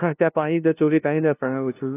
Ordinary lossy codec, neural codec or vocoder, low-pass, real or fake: AAC, 16 kbps; codec, 16 kHz, 0.5 kbps, FunCodec, trained on LibriTTS, 25 frames a second; 3.6 kHz; fake